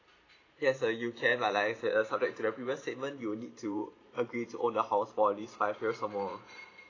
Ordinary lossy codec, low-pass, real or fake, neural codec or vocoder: AAC, 32 kbps; 7.2 kHz; fake; vocoder, 44.1 kHz, 128 mel bands every 512 samples, BigVGAN v2